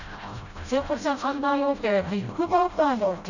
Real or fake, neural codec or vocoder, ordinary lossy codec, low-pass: fake; codec, 16 kHz, 0.5 kbps, FreqCodec, smaller model; none; 7.2 kHz